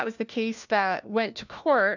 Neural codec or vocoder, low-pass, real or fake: codec, 16 kHz, 1 kbps, FunCodec, trained on LibriTTS, 50 frames a second; 7.2 kHz; fake